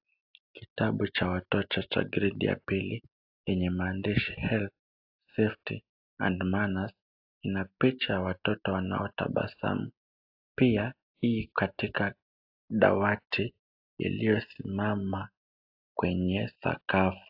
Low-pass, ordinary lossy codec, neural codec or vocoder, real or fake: 5.4 kHz; AAC, 48 kbps; none; real